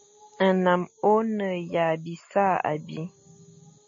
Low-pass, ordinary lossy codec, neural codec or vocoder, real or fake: 7.2 kHz; MP3, 32 kbps; none; real